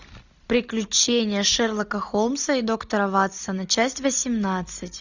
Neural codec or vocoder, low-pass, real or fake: none; 7.2 kHz; real